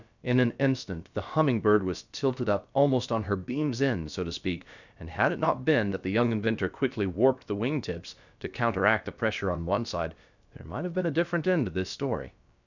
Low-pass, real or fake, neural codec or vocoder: 7.2 kHz; fake; codec, 16 kHz, about 1 kbps, DyCAST, with the encoder's durations